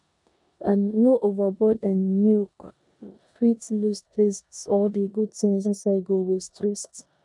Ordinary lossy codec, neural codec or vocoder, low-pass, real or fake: none; codec, 16 kHz in and 24 kHz out, 0.9 kbps, LongCat-Audio-Codec, four codebook decoder; 10.8 kHz; fake